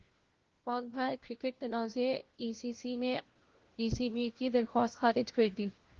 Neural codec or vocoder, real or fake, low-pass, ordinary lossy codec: codec, 16 kHz, 1 kbps, FunCodec, trained on LibriTTS, 50 frames a second; fake; 7.2 kHz; Opus, 16 kbps